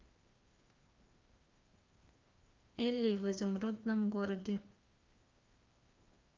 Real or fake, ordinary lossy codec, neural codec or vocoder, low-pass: fake; Opus, 32 kbps; codec, 16 kHz, 2 kbps, FreqCodec, larger model; 7.2 kHz